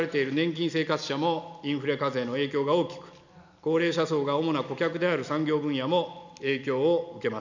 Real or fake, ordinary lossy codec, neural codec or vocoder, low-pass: real; none; none; 7.2 kHz